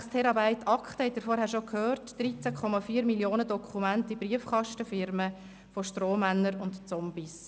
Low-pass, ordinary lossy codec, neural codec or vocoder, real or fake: none; none; none; real